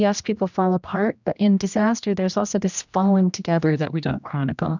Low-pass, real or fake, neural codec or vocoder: 7.2 kHz; fake; codec, 16 kHz, 1 kbps, X-Codec, HuBERT features, trained on general audio